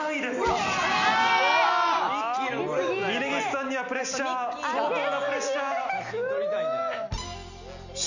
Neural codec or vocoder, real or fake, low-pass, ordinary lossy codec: none; real; 7.2 kHz; none